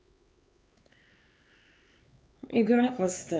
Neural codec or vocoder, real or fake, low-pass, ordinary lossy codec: codec, 16 kHz, 4 kbps, X-Codec, HuBERT features, trained on LibriSpeech; fake; none; none